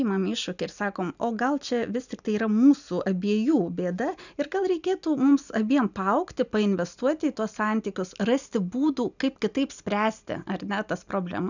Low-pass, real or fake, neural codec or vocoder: 7.2 kHz; real; none